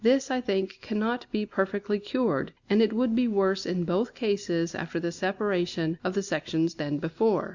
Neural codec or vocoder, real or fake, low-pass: none; real; 7.2 kHz